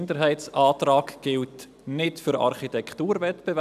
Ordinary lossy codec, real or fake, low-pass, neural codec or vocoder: none; real; 14.4 kHz; none